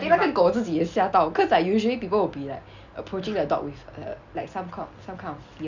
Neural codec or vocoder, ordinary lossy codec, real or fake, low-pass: none; Opus, 64 kbps; real; 7.2 kHz